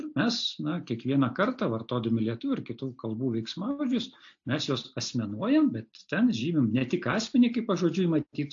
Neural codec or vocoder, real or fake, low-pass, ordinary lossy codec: none; real; 7.2 kHz; AAC, 48 kbps